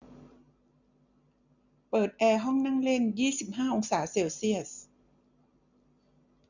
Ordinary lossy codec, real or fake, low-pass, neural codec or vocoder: none; real; 7.2 kHz; none